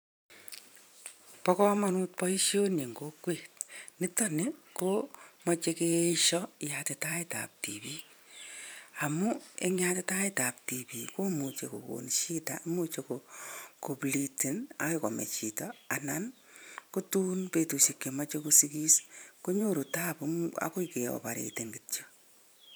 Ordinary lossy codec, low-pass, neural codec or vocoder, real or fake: none; none; vocoder, 44.1 kHz, 128 mel bands every 512 samples, BigVGAN v2; fake